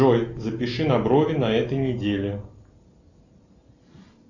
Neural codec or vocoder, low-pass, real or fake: none; 7.2 kHz; real